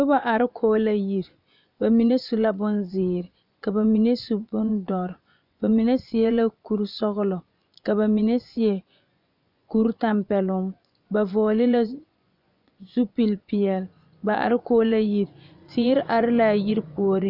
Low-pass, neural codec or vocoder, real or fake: 5.4 kHz; vocoder, 24 kHz, 100 mel bands, Vocos; fake